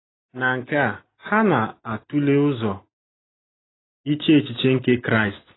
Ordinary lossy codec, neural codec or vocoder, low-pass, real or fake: AAC, 16 kbps; none; 7.2 kHz; real